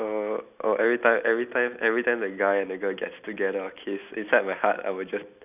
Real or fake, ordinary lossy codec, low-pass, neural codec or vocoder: real; none; 3.6 kHz; none